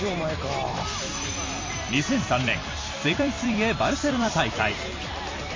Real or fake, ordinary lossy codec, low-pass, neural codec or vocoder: fake; MP3, 32 kbps; 7.2 kHz; vocoder, 44.1 kHz, 128 mel bands every 256 samples, BigVGAN v2